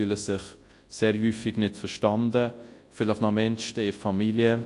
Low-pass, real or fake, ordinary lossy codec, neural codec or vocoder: 10.8 kHz; fake; AAC, 48 kbps; codec, 24 kHz, 0.9 kbps, WavTokenizer, large speech release